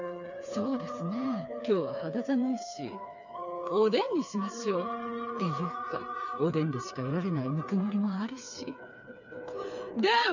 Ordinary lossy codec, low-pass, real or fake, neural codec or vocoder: none; 7.2 kHz; fake; codec, 16 kHz, 4 kbps, FreqCodec, smaller model